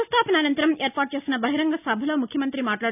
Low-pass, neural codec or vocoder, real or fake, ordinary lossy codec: 3.6 kHz; none; real; none